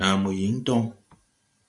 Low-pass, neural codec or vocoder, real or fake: 10.8 kHz; vocoder, 44.1 kHz, 128 mel bands every 512 samples, BigVGAN v2; fake